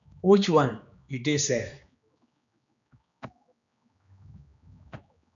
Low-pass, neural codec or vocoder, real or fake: 7.2 kHz; codec, 16 kHz, 2 kbps, X-Codec, HuBERT features, trained on general audio; fake